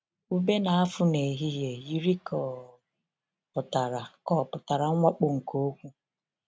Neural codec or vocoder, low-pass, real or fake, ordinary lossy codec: none; none; real; none